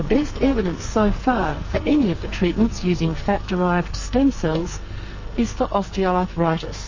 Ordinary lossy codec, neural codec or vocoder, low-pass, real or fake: MP3, 32 kbps; codec, 44.1 kHz, 2.6 kbps, SNAC; 7.2 kHz; fake